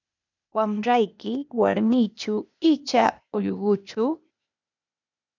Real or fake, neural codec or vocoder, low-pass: fake; codec, 16 kHz, 0.8 kbps, ZipCodec; 7.2 kHz